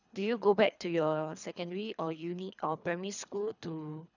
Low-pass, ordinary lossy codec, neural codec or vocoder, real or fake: 7.2 kHz; none; codec, 24 kHz, 3 kbps, HILCodec; fake